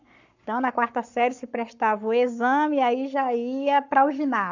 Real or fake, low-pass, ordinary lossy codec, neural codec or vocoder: fake; 7.2 kHz; none; codec, 44.1 kHz, 7.8 kbps, Pupu-Codec